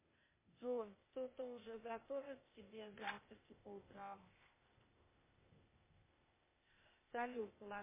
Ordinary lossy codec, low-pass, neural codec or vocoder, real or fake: MP3, 16 kbps; 3.6 kHz; codec, 16 kHz, 0.8 kbps, ZipCodec; fake